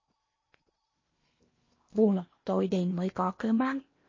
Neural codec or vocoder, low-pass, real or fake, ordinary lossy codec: codec, 16 kHz in and 24 kHz out, 0.8 kbps, FocalCodec, streaming, 65536 codes; 7.2 kHz; fake; MP3, 32 kbps